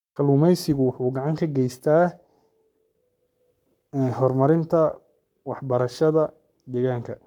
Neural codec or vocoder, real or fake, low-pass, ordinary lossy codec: codec, 44.1 kHz, 7.8 kbps, Pupu-Codec; fake; 19.8 kHz; none